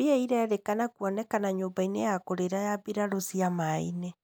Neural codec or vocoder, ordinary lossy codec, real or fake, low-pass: none; none; real; none